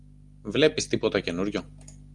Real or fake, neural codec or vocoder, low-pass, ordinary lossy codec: real; none; 10.8 kHz; Opus, 32 kbps